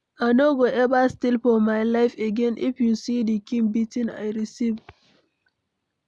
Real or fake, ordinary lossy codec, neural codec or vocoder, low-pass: real; none; none; none